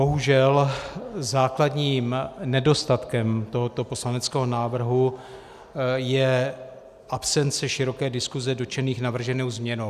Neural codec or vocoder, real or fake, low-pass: none; real; 14.4 kHz